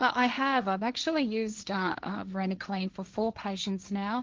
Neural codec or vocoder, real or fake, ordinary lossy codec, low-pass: codec, 16 kHz, 1.1 kbps, Voila-Tokenizer; fake; Opus, 32 kbps; 7.2 kHz